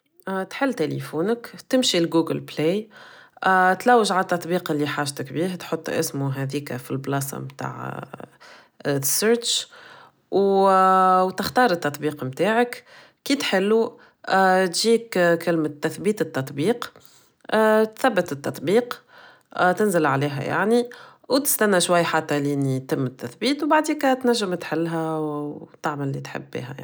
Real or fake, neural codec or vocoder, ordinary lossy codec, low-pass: real; none; none; none